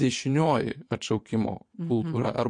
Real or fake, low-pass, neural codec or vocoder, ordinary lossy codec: fake; 9.9 kHz; vocoder, 22.05 kHz, 80 mel bands, WaveNeXt; MP3, 48 kbps